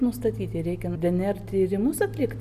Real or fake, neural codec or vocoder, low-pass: real; none; 14.4 kHz